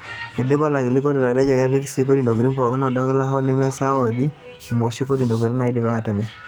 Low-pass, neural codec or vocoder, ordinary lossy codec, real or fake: none; codec, 44.1 kHz, 2.6 kbps, SNAC; none; fake